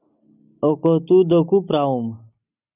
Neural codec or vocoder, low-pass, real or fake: none; 3.6 kHz; real